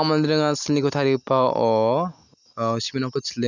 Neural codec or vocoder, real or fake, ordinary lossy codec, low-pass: none; real; none; 7.2 kHz